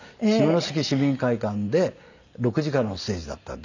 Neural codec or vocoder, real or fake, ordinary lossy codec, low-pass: none; real; none; 7.2 kHz